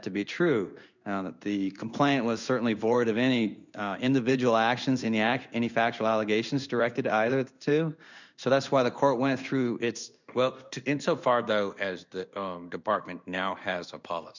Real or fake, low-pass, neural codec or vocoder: fake; 7.2 kHz; codec, 16 kHz in and 24 kHz out, 1 kbps, XY-Tokenizer